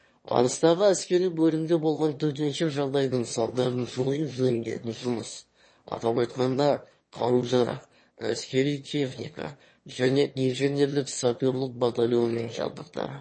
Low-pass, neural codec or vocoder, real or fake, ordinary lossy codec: 9.9 kHz; autoencoder, 22.05 kHz, a latent of 192 numbers a frame, VITS, trained on one speaker; fake; MP3, 32 kbps